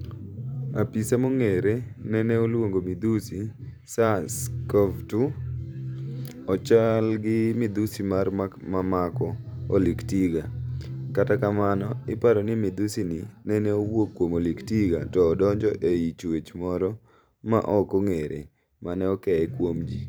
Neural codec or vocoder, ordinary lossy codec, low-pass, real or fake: vocoder, 44.1 kHz, 128 mel bands every 512 samples, BigVGAN v2; none; none; fake